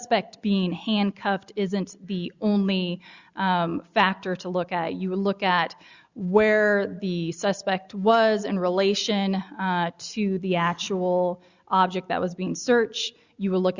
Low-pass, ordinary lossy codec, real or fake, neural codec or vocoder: 7.2 kHz; Opus, 64 kbps; real; none